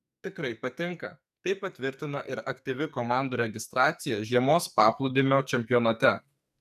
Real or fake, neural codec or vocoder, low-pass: fake; codec, 44.1 kHz, 2.6 kbps, SNAC; 14.4 kHz